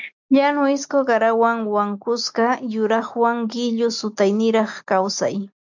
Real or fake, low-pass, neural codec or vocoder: real; 7.2 kHz; none